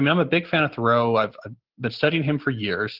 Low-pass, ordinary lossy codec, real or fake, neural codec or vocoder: 5.4 kHz; Opus, 16 kbps; real; none